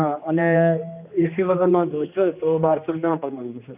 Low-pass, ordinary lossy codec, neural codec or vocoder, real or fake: 3.6 kHz; none; codec, 16 kHz, 2 kbps, X-Codec, HuBERT features, trained on general audio; fake